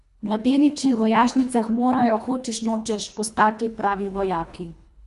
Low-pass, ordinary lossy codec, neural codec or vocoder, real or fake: 10.8 kHz; Opus, 64 kbps; codec, 24 kHz, 1.5 kbps, HILCodec; fake